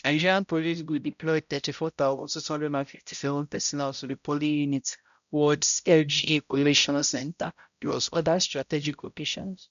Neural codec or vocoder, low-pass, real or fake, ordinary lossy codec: codec, 16 kHz, 0.5 kbps, X-Codec, HuBERT features, trained on balanced general audio; 7.2 kHz; fake; none